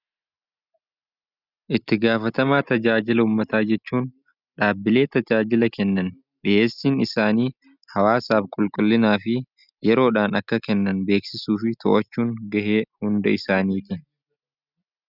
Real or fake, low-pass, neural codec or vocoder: real; 5.4 kHz; none